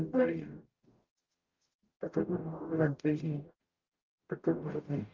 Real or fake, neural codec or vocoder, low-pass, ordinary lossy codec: fake; codec, 44.1 kHz, 0.9 kbps, DAC; 7.2 kHz; Opus, 24 kbps